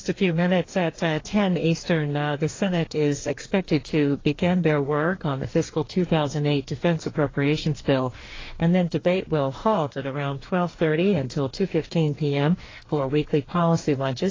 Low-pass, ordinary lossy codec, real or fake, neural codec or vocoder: 7.2 kHz; AAC, 32 kbps; fake; codec, 44.1 kHz, 2.6 kbps, DAC